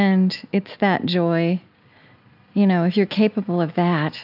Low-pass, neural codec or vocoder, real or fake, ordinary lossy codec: 5.4 kHz; none; real; AAC, 48 kbps